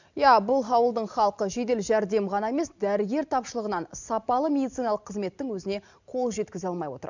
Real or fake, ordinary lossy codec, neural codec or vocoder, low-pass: real; none; none; 7.2 kHz